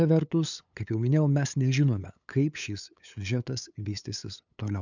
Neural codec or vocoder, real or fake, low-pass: codec, 16 kHz, 8 kbps, FunCodec, trained on LibriTTS, 25 frames a second; fake; 7.2 kHz